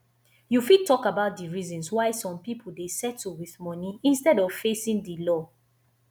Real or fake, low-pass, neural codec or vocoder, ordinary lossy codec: real; none; none; none